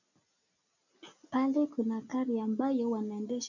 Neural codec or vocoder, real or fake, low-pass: none; real; 7.2 kHz